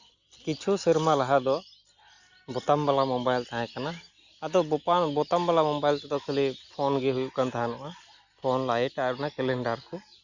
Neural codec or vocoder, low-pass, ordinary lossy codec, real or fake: none; 7.2 kHz; Opus, 64 kbps; real